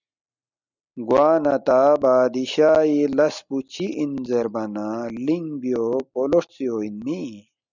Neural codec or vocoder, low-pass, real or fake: none; 7.2 kHz; real